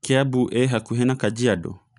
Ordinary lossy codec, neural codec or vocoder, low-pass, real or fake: none; none; 10.8 kHz; real